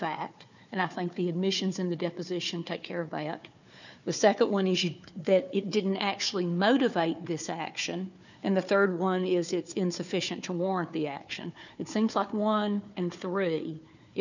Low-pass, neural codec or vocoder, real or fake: 7.2 kHz; codec, 16 kHz, 4 kbps, FunCodec, trained on Chinese and English, 50 frames a second; fake